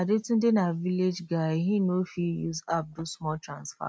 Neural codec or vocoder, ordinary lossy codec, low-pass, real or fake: none; none; none; real